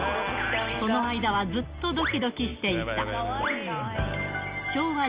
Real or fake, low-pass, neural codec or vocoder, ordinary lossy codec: real; 3.6 kHz; none; Opus, 24 kbps